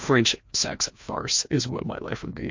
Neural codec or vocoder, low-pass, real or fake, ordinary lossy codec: codec, 16 kHz, 1 kbps, FreqCodec, larger model; 7.2 kHz; fake; MP3, 48 kbps